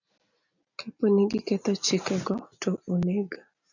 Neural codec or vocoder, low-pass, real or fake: none; 7.2 kHz; real